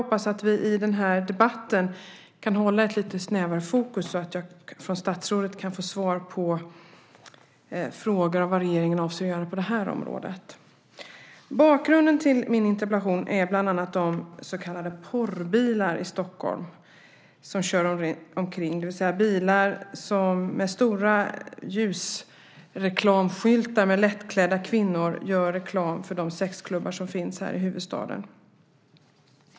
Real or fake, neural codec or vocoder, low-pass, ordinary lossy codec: real; none; none; none